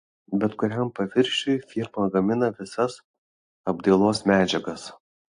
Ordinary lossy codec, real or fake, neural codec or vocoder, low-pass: AAC, 48 kbps; real; none; 9.9 kHz